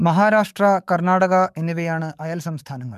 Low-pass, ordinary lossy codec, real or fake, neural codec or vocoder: 14.4 kHz; MP3, 96 kbps; fake; codec, 44.1 kHz, 7.8 kbps, DAC